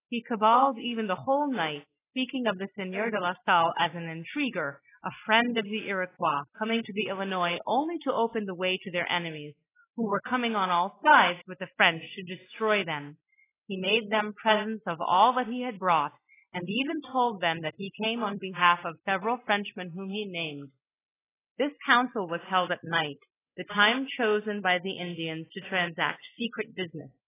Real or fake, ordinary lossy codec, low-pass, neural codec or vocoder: real; AAC, 16 kbps; 3.6 kHz; none